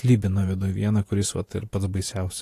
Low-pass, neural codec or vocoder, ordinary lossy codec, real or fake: 14.4 kHz; vocoder, 44.1 kHz, 128 mel bands every 512 samples, BigVGAN v2; AAC, 48 kbps; fake